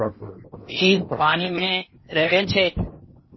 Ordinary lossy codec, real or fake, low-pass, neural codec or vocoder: MP3, 24 kbps; fake; 7.2 kHz; codec, 16 kHz in and 24 kHz out, 0.8 kbps, FocalCodec, streaming, 65536 codes